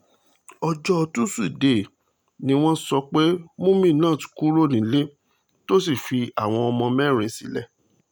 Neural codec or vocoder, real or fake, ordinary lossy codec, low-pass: none; real; none; none